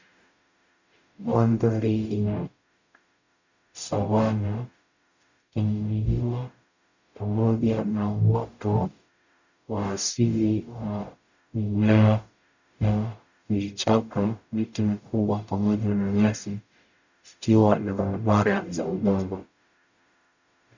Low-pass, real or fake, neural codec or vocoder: 7.2 kHz; fake; codec, 44.1 kHz, 0.9 kbps, DAC